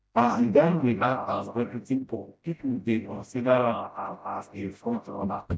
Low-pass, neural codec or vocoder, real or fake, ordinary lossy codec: none; codec, 16 kHz, 0.5 kbps, FreqCodec, smaller model; fake; none